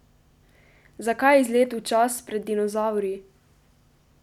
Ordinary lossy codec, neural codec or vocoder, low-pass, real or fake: none; none; 19.8 kHz; real